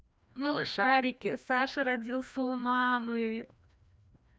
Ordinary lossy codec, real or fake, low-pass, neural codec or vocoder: none; fake; none; codec, 16 kHz, 1 kbps, FreqCodec, larger model